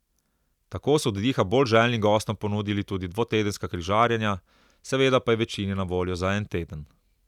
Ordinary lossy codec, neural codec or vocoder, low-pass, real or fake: none; none; 19.8 kHz; real